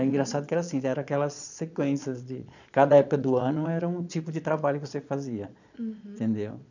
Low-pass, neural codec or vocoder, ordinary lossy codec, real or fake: 7.2 kHz; vocoder, 22.05 kHz, 80 mel bands, Vocos; none; fake